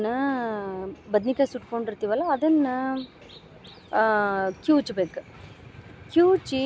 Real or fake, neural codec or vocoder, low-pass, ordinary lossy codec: real; none; none; none